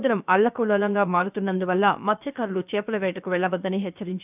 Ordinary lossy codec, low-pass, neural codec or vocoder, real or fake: none; 3.6 kHz; codec, 16 kHz, about 1 kbps, DyCAST, with the encoder's durations; fake